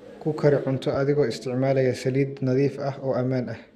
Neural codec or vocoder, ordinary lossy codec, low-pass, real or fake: none; Opus, 64 kbps; 14.4 kHz; real